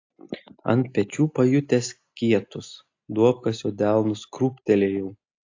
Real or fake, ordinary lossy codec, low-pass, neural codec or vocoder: real; AAC, 48 kbps; 7.2 kHz; none